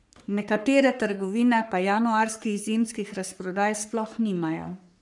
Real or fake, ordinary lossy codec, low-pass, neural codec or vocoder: fake; none; 10.8 kHz; codec, 44.1 kHz, 3.4 kbps, Pupu-Codec